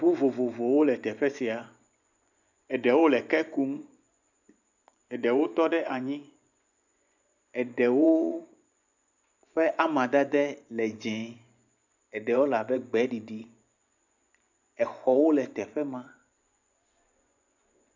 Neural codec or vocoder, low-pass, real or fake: vocoder, 24 kHz, 100 mel bands, Vocos; 7.2 kHz; fake